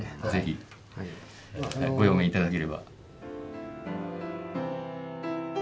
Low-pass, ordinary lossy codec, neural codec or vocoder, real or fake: none; none; none; real